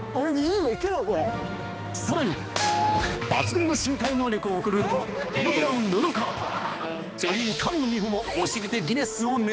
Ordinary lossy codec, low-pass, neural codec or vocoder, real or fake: none; none; codec, 16 kHz, 2 kbps, X-Codec, HuBERT features, trained on balanced general audio; fake